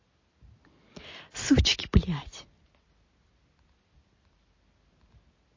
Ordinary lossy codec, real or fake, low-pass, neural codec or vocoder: MP3, 32 kbps; real; 7.2 kHz; none